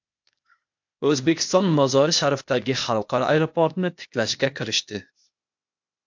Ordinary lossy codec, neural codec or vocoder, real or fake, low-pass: MP3, 64 kbps; codec, 16 kHz, 0.8 kbps, ZipCodec; fake; 7.2 kHz